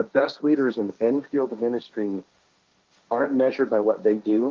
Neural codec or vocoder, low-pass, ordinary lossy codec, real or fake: codec, 16 kHz, 1.1 kbps, Voila-Tokenizer; 7.2 kHz; Opus, 32 kbps; fake